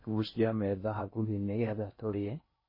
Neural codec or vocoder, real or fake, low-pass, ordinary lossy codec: codec, 16 kHz in and 24 kHz out, 0.6 kbps, FocalCodec, streaming, 4096 codes; fake; 5.4 kHz; MP3, 24 kbps